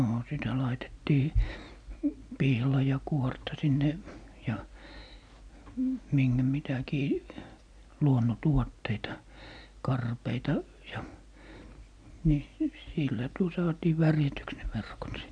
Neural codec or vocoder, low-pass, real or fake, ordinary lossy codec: none; 9.9 kHz; real; none